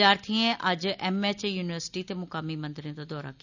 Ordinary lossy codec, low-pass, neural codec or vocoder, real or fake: none; 7.2 kHz; none; real